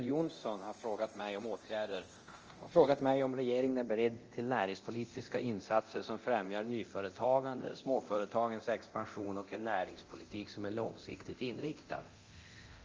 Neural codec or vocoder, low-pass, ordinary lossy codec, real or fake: codec, 24 kHz, 0.9 kbps, DualCodec; 7.2 kHz; Opus, 24 kbps; fake